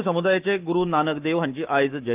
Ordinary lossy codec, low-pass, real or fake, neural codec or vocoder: Opus, 32 kbps; 3.6 kHz; fake; autoencoder, 48 kHz, 128 numbers a frame, DAC-VAE, trained on Japanese speech